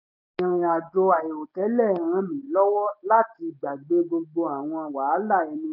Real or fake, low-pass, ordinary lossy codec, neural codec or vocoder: real; 5.4 kHz; none; none